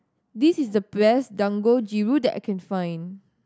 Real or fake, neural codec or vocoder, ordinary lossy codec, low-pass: real; none; none; none